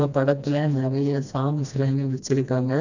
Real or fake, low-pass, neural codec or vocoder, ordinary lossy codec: fake; 7.2 kHz; codec, 16 kHz, 1 kbps, FreqCodec, smaller model; none